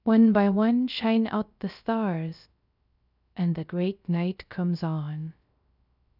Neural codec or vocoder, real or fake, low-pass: codec, 16 kHz, 0.3 kbps, FocalCodec; fake; 5.4 kHz